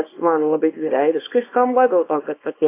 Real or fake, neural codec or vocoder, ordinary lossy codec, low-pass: fake; codec, 24 kHz, 0.9 kbps, WavTokenizer, small release; AAC, 24 kbps; 3.6 kHz